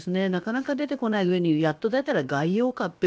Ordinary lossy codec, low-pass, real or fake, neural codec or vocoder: none; none; fake; codec, 16 kHz, about 1 kbps, DyCAST, with the encoder's durations